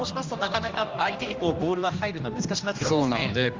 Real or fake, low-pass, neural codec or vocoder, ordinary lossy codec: fake; 7.2 kHz; codec, 16 kHz, 1 kbps, X-Codec, HuBERT features, trained on general audio; Opus, 32 kbps